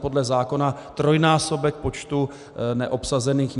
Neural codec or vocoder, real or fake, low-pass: none; real; 10.8 kHz